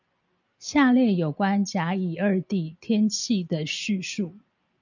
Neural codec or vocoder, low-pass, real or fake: none; 7.2 kHz; real